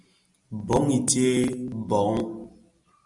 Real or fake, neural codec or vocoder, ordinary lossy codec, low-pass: real; none; Opus, 64 kbps; 10.8 kHz